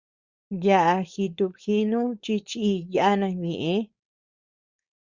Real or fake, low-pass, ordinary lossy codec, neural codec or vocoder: fake; 7.2 kHz; Opus, 64 kbps; codec, 16 kHz, 4.8 kbps, FACodec